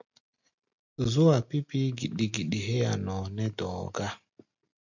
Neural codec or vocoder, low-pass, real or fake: none; 7.2 kHz; real